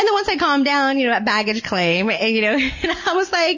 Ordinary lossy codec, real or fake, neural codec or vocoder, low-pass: MP3, 32 kbps; real; none; 7.2 kHz